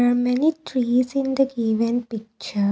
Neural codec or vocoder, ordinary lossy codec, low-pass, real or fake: none; none; none; real